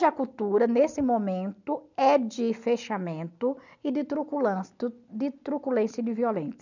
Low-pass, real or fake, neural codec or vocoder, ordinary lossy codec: 7.2 kHz; real; none; none